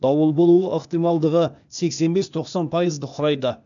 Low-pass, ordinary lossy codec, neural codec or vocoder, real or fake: 7.2 kHz; none; codec, 16 kHz, 0.8 kbps, ZipCodec; fake